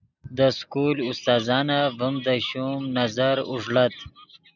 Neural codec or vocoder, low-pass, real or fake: none; 7.2 kHz; real